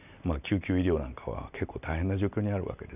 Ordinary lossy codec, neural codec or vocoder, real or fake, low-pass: none; none; real; 3.6 kHz